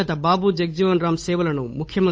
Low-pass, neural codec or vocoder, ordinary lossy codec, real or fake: none; codec, 16 kHz, 8 kbps, FunCodec, trained on Chinese and English, 25 frames a second; none; fake